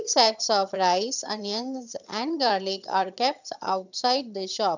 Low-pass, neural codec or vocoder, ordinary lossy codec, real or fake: 7.2 kHz; vocoder, 22.05 kHz, 80 mel bands, HiFi-GAN; none; fake